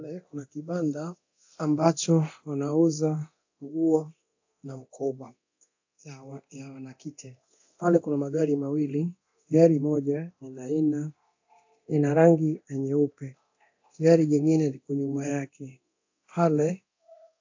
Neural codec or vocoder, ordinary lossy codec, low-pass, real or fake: codec, 24 kHz, 0.9 kbps, DualCodec; AAC, 48 kbps; 7.2 kHz; fake